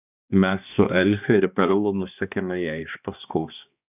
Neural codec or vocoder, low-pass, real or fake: codec, 16 kHz, 2 kbps, X-Codec, HuBERT features, trained on balanced general audio; 3.6 kHz; fake